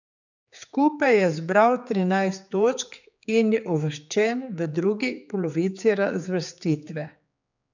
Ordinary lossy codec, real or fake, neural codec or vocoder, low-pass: none; fake; codec, 16 kHz, 4 kbps, X-Codec, HuBERT features, trained on general audio; 7.2 kHz